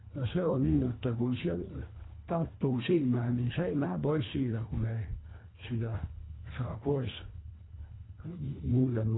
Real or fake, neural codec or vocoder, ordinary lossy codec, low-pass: fake; codec, 24 kHz, 1.5 kbps, HILCodec; AAC, 16 kbps; 7.2 kHz